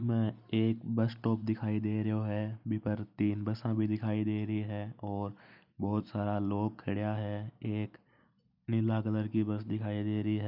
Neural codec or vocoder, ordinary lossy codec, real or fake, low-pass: none; none; real; 5.4 kHz